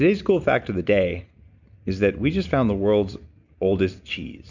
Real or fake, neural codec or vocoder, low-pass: real; none; 7.2 kHz